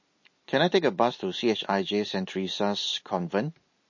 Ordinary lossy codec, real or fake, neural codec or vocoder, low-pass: MP3, 32 kbps; real; none; 7.2 kHz